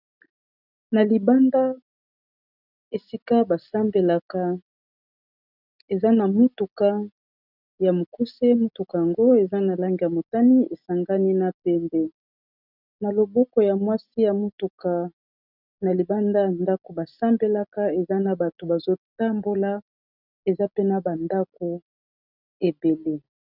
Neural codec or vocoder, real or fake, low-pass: none; real; 5.4 kHz